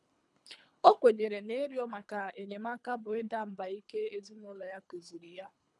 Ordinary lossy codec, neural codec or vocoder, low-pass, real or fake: none; codec, 24 kHz, 3 kbps, HILCodec; none; fake